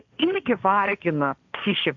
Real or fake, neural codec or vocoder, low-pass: fake; codec, 16 kHz, 1.1 kbps, Voila-Tokenizer; 7.2 kHz